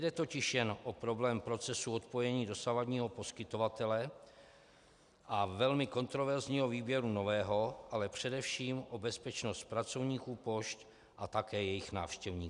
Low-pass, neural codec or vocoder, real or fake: 10.8 kHz; none; real